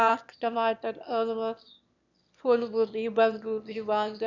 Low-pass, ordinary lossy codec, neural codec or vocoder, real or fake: 7.2 kHz; none; autoencoder, 22.05 kHz, a latent of 192 numbers a frame, VITS, trained on one speaker; fake